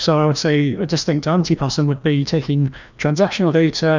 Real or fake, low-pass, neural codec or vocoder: fake; 7.2 kHz; codec, 16 kHz, 1 kbps, FreqCodec, larger model